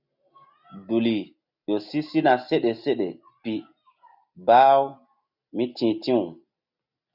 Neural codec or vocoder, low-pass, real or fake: none; 5.4 kHz; real